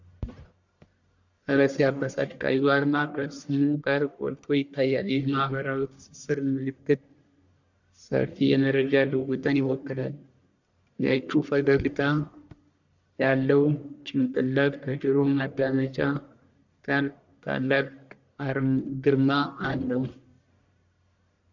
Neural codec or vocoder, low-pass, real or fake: codec, 44.1 kHz, 1.7 kbps, Pupu-Codec; 7.2 kHz; fake